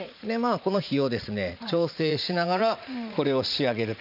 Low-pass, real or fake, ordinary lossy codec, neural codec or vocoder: 5.4 kHz; fake; none; vocoder, 44.1 kHz, 80 mel bands, Vocos